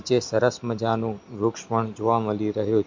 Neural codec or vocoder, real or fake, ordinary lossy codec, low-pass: none; real; MP3, 48 kbps; 7.2 kHz